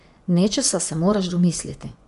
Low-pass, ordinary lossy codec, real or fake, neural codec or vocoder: 10.8 kHz; none; fake; vocoder, 24 kHz, 100 mel bands, Vocos